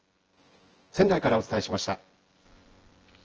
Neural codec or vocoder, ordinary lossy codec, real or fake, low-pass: vocoder, 24 kHz, 100 mel bands, Vocos; Opus, 16 kbps; fake; 7.2 kHz